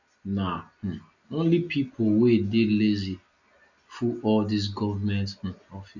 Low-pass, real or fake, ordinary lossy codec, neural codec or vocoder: 7.2 kHz; real; none; none